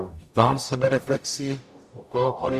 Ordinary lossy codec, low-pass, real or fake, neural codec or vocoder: Opus, 64 kbps; 14.4 kHz; fake; codec, 44.1 kHz, 0.9 kbps, DAC